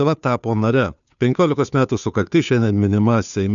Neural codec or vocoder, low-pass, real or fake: codec, 16 kHz, 2 kbps, FunCodec, trained on Chinese and English, 25 frames a second; 7.2 kHz; fake